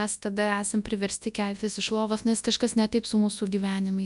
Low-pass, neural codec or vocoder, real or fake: 10.8 kHz; codec, 24 kHz, 0.9 kbps, WavTokenizer, large speech release; fake